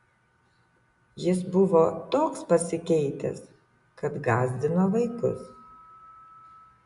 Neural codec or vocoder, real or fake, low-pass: none; real; 10.8 kHz